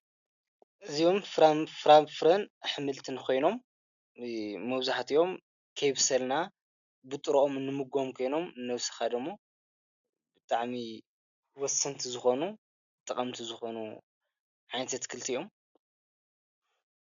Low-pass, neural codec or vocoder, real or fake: 7.2 kHz; none; real